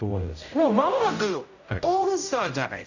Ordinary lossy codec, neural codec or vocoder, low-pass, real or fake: none; codec, 16 kHz, 0.5 kbps, X-Codec, HuBERT features, trained on general audio; 7.2 kHz; fake